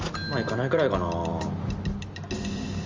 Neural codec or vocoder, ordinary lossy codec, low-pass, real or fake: none; Opus, 32 kbps; 7.2 kHz; real